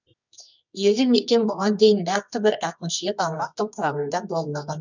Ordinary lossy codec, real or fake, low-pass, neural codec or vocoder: none; fake; 7.2 kHz; codec, 24 kHz, 0.9 kbps, WavTokenizer, medium music audio release